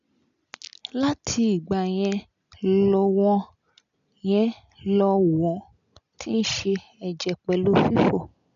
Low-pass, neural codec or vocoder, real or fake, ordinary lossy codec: 7.2 kHz; none; real; MP3, 96 kbps